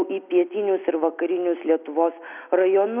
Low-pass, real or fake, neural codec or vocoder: 3.6 kHz; real; none